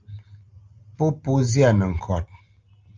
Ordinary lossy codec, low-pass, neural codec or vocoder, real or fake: Opus, 24 kbps; 7.2 kHz; none; real